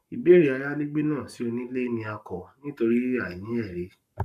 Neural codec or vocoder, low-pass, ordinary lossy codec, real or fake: codec, 44.1 kHz, 7.8 kbps, Pupu-Codec; 14.4 kHz; none; fake